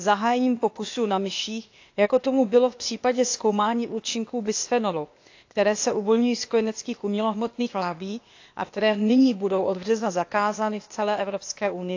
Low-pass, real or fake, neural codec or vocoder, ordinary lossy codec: 7.2 kHz; fake; codec, 16 kHz, 0.8 kbps, ZipCodec; AAC, 48 kbps